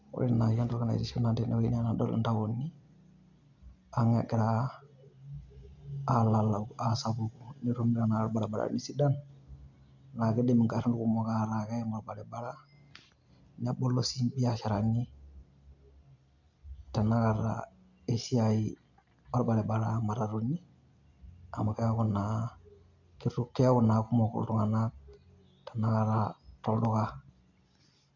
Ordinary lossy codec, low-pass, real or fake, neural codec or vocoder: none; 7.2 kHz; real; none